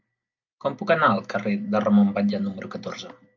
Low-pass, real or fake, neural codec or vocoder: 7.2 kHz; real; none